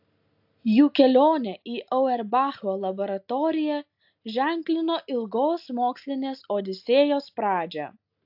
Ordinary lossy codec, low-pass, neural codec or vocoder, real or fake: AAC, 48 kbps; 5.4 kHz; none; real